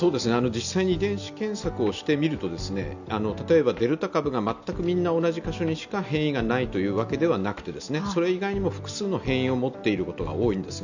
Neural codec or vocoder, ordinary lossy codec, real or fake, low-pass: none; none; real; 7.2 kHz